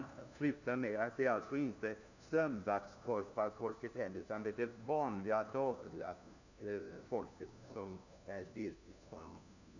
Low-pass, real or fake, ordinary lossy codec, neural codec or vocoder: 7.2 kHz; fake; none; codec, 16 kHz, 1 kbps, FunCodec, trained on LibriTTS, 50 frames a second